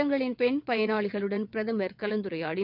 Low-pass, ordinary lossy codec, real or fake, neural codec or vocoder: 5.4 kHz; none; fake; vocoder, 22.05 kHz, 80 mel bands, WaveNeXt